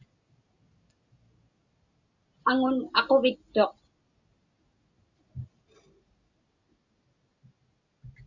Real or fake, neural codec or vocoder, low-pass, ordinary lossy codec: real; none; 7.2 kHz; Opus, 64 kbps